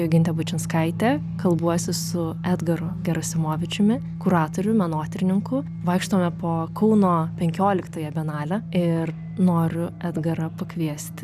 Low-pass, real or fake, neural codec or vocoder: 14.4 kHz; real; none